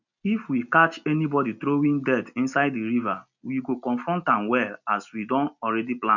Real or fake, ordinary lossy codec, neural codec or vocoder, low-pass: real; none; none; 7.2 kHz